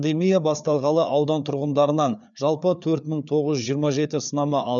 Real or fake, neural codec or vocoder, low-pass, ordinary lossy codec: fake; codec, 16 kHz, 4 kbps, FreqCodec, larger model; 7.2 kHz; none